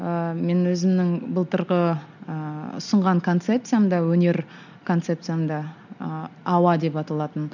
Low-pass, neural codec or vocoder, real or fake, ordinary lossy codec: 7.2 kHz; none; real; none